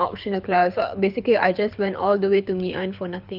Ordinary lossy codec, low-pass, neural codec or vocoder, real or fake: none; 5.4 kHz; codec, 24 kHz, 6 kbps, HILCodec; fake